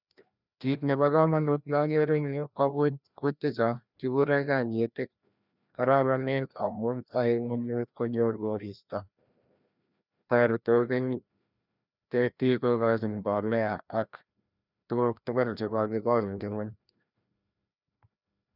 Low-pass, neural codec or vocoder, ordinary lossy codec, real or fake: 5.4 kHz; codec, 16 kHz, 1 kbps, FreqCodec, larger model; none; fake